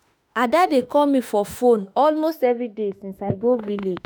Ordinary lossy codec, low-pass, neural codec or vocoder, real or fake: none; none; autoencoder, 48 kHz, 32 numbers a frame, DAC-VAE, trained on Japanese speech; fake